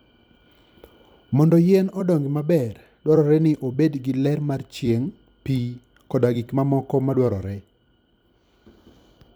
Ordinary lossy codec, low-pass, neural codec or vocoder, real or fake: none; none; vocoder, 44.1 kHz, 128 mel bands every 256 samples, BigVGAN v2; fake